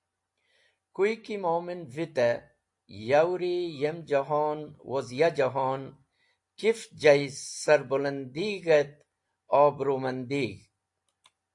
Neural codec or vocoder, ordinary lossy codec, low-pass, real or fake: none; AAC, 64 kbps; 10.8 kHz; real